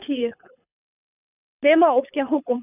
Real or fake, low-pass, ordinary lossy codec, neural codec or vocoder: fake; 3.6 kHz; none; codec, 24 kHz, 6 kbps, HILCodec